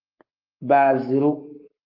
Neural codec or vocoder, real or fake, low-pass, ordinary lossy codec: codec, 44.1 kHz, 7.8 kbps, Pupu-Codec; fake; 5.4 kHz; Opus, 24 kbps